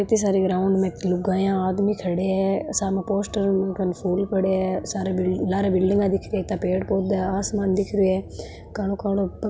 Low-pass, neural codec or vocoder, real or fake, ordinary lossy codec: none; none; real; none